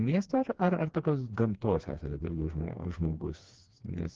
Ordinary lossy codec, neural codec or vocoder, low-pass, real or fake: Opus, 16 kbps; codec, 16 kHz, 2 kbps, FreqCodec, smaller model; 7.2 kHz; fake